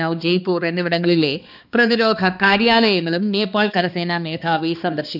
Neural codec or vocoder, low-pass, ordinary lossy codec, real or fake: codec, 16 kHz, 2 kbps, X-Codec, HuBERT features, trained on balanced general audio; 5.4 kHz; none; fake